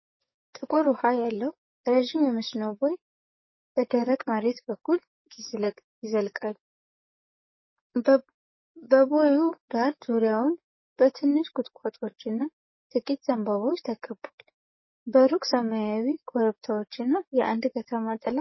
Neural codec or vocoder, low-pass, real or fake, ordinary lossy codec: codec, 44.1 kHz, 7.8 kbps, DAC; 7.2 kHz; fake; MP3, 24 kbps